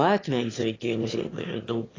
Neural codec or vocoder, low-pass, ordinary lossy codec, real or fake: autoencoder, 22.05 kHz, a latent of 192 numbers a frame, VITS, trained on one speaker; 7.2 kHz; AAC, 32 kbps; fake